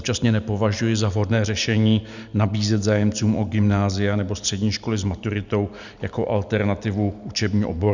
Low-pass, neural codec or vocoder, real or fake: 7.2 kHz; none; real